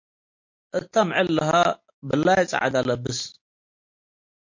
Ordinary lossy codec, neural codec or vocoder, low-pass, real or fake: MP3, 48 kbps; none; 7.2 kHz; real